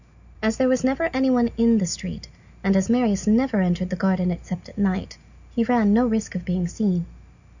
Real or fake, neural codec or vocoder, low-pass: real; none; 7.2 kHz